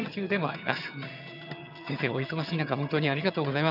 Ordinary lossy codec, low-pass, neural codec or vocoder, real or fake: none; 5.4 kHz; vocoder, 22.05 kHz, 80 mel bands, HiFi-GAN; fake